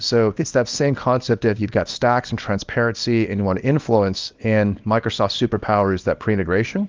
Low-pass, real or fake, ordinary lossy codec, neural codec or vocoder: 7.2 kHz; fake; Opus, 32 kbps; codec, 24 kHz, 0.9 kbps, WavTokenizer, small release